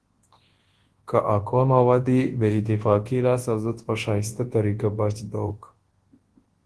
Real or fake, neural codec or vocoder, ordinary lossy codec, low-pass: fake; codec, 24 kHz, 0.9 kbps, WavTokenizer, large speech release; Opus, 16 kbps; 10.8 kHz